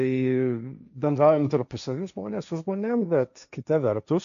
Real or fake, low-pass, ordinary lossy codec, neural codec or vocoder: fake; 7.2 kHz; MP3, 96 kbps; codec, 16 kHz, 1.1 kbps, Voila-Tokenizer